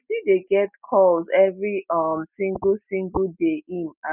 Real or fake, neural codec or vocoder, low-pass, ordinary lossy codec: real; none; 3.6 kHz; none